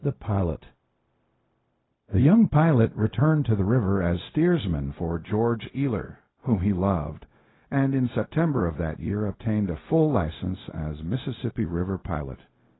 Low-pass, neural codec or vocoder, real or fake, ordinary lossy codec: 7.2 kHz; codec, 16 kHz, 0.4 kbps, LongCat-Audio-Codec; fake; AAC, 16 kbps